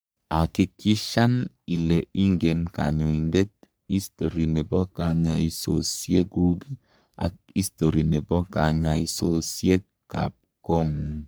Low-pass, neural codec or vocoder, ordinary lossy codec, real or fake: none; codec, 44.1 kHz, 3.4 kbps, Pupu-Codec; none; fake